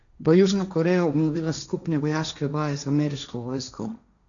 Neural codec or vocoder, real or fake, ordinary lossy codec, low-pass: codec, 16 kHz, 1.1 kbps, Voila-Tokenizer; fake; none; 7.2 kHz